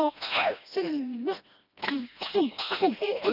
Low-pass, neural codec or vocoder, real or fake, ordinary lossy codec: 5.4 kHz; codec, 16 kHz, 1 kbps, FreqCodec, smaller model; fake; none